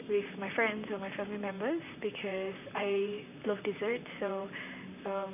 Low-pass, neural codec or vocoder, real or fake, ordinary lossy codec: 3.6 kHz; vocoder, 44.1 kHz, 128 mel bands, Pupu-Vocoder; fake; none